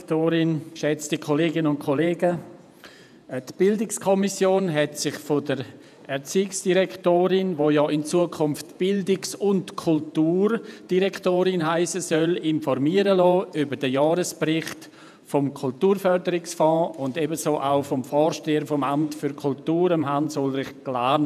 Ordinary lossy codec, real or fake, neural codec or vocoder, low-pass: none; fake; vocoder, 48 kHz, 128 mel bands, Vocos; 14.4 kHz